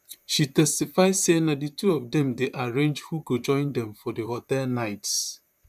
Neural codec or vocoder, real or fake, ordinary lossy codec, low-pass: vocoder, 44.1 kHz, 128 mel bands, Pupu-Vocoder; fake; none; 14.4 kHz